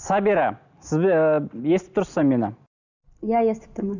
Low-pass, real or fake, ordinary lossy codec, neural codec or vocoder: 7.2 kHz; real; none; none